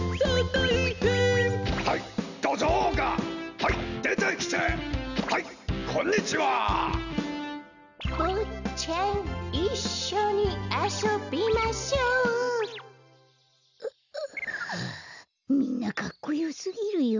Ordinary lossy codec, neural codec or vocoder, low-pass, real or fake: none; none; 7.2 kHz; real